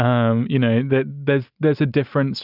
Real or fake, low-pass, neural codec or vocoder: real; 5.4 kHz; none